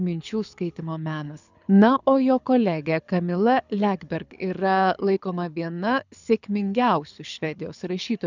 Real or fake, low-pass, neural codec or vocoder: fake; 7.2 kHz; codec, 24 kHz, 6 kbps, HILCodec